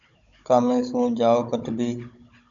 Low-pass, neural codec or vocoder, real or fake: 7.2 kHz; codec, 16 kHz, 16 kbps, FunCodec, trained on Chinese and English, 50 frames a second; fake